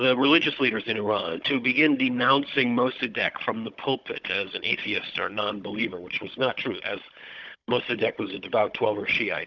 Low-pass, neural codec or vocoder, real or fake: 7.2 kHz; codec, 16 kHz, 16 kbps, FunCodec, trained on Chinese and English, 50 frames a second; fake